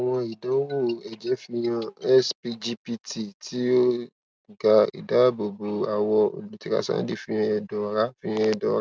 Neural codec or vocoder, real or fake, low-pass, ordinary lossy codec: none; real; none; none